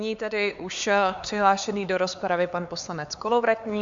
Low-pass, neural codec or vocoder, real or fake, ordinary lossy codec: 7.2 kHz; codec, 16 kHz, 4 kbps, X-Codec, HuBERT features, trained on LibriSpeech; fake; Opus, 64 kbps